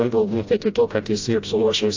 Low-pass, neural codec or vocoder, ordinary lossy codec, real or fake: 7.2 kHz; codec, 16 kHz, 0.5 kbps, FreqCodec, smaller model; AAC, 48 kbps; fake